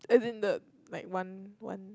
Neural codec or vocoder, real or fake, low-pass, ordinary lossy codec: none; real; none; none